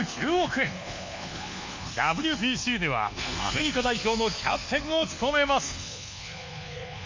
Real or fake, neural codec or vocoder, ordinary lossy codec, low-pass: fake; codec, 24 kHz, 1.2 kbps, DualCodec; MP3, 64 kbps; 7.2 kHz